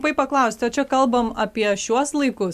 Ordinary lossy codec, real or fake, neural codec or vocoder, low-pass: MP3, 96 kbps; real; none; 14.4 kHz